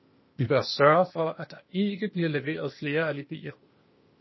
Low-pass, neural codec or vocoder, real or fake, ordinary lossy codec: 7.2 kHz; codec, 16 kHz, 0.8 kbps, ZipCodec; fake; MP3, 24 kbps